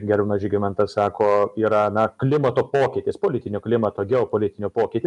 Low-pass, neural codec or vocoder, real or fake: 10.8 kHz; none; real